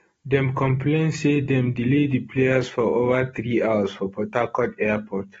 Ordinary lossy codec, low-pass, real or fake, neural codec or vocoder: AAC, 24 kbps; 10.8 kHz; real; none